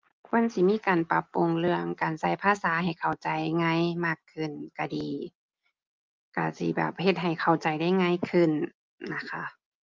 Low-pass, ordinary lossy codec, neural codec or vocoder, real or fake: 7.2 kHz; Opus, 24 kbps; none; real